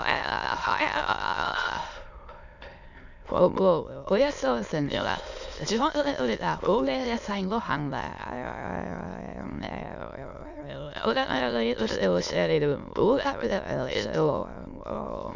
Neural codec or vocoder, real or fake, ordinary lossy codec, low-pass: autoencoder, 22.05 kHz, a latent of 192 numbers a frame, VITS, trained on many speakers; fake; none; 7.2 kHz